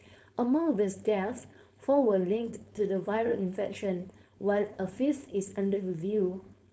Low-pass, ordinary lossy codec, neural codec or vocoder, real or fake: none; none; codec, 16 kHz, 4.8 kbps, FACodec; fake